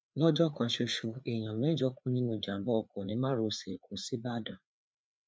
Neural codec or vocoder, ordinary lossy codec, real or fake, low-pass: codec, 16 kHz, 4 kbps, FreqCodec, larger model; none; fake; none